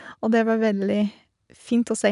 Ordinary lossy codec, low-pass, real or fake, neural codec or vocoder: none; 10.8 kHz; real; none